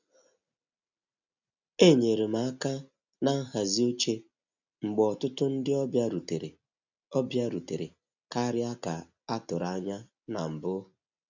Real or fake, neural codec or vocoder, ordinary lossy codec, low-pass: real; none; none; 7.2 kHz